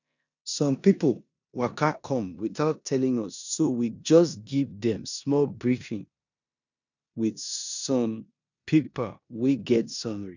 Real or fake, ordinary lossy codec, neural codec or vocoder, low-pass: fake; none; codec, 16 kHz in and 24 kHz out, 0.9 kbps, LongCat-Audio-Codec, four codebook decoder; 7.2 kHz